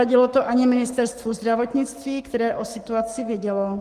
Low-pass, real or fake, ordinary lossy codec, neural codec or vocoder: 14.4 kHz; fake; Opus, 24 kbps; codec, 44.1 kHz, 7.8 kbps, Pupu-Codec